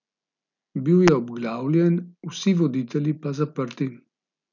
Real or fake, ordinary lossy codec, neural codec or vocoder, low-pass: real; none; none; 7.2 kHz